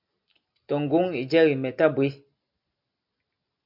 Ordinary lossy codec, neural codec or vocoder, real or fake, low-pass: MP3, 48 kbps; none; real; 5.4 kHz